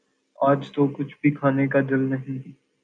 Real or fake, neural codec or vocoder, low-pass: real; none; 9.9 kHz